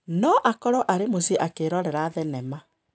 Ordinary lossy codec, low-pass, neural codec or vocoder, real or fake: none; none; none; real